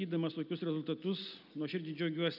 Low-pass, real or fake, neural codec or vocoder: 5.4 kHz; real; none